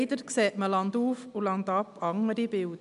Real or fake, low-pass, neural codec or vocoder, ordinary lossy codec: real; 10.8 kHz; none; none